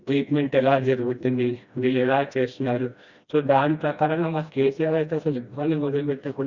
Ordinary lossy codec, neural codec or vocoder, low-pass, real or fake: Opus, 64 kbps; codec, 16 kHz, 1 kbps, FreqCodec, smaller model; 7.2 kHz; fake